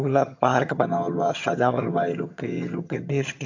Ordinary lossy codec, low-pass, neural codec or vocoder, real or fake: AAC, 48 kbps; 7.2 kHz; vocoder, 22.05 kHz, 80 mel bands, HiFi-GAN; fake